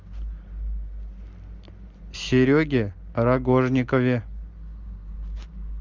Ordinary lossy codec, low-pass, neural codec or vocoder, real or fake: Opus, 32 kbps; 7.2 kHz; none; real